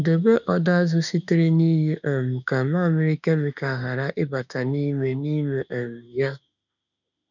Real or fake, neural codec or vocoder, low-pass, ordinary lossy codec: fake; autoencoder, 48 kHz, 32 numbers a frame, DAC-VAE, trained on Japanese speech; 7.2 kHz; none